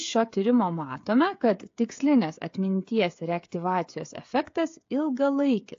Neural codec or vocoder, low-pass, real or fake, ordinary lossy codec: codec, 16 kHz, 8 kbps, FreqCodec, smaller model; 7.2 kHz; fake; AAC, 48 kbps